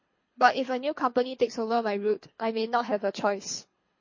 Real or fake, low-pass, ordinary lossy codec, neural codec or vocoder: fake; 7.2 kHz; MP3, 32 kbps; codec, 24 kHz, 3 kbps, HILCodec